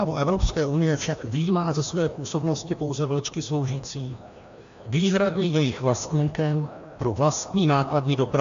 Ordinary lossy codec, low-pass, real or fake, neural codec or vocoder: AAC, 64 kbps; 7.2 kHz; fake; codec, 16 kHz, 1 kbps, FreqCodec, larger model